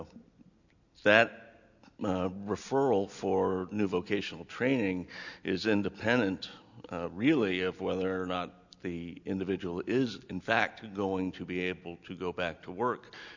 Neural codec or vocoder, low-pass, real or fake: none; 7.2 kHz; real